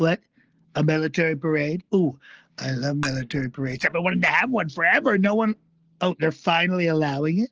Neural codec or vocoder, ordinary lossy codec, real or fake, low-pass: none; Opus, 16 kbps; real; 7.2 kHz